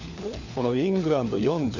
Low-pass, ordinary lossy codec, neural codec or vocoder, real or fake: 7.2 kHz; none; codec, 16 kHz, 4 kbps, FunCodec, trained on LibriTTS, 50 frames a second; fake